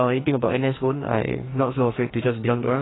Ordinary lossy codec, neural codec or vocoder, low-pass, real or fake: AAC, 16 kbps; codec, 44.1 kHz, 2.6 kbps, SNAC; 7.2 kHz; fake